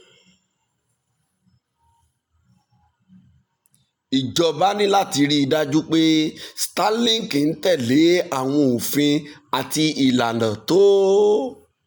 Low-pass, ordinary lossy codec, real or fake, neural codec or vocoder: none; none; real; none